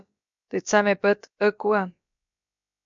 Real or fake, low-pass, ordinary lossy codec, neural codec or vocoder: fake; 7.2 kHz; MP3, 64 kbps; codec, 16 kHz, about 1 kbps, DyCAST, with the encoder's durations